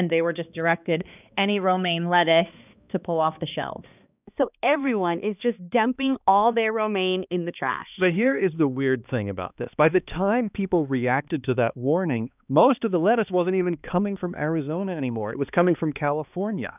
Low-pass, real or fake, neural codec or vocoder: 3.6 kHz; fake; codec, 16 kHz, 2 kbps, X-Codec, HuBERT features, trained on balanced general audio